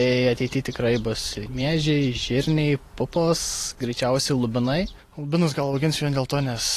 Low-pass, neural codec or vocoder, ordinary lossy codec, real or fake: 14.4 kHz; vocoder, 44.1 kHz, 128 mel bands every 512 samples, BigVGAN v2; AAC, 48 kbps; fake